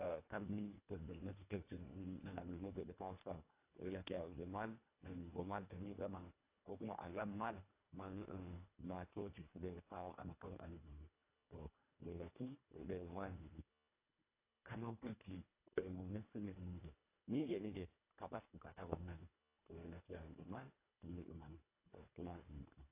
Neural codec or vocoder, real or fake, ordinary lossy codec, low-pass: codec, 24 kHz, 1.5 kbps, HILCodec; fake; AAC, 32 kbps; 3.6 kHz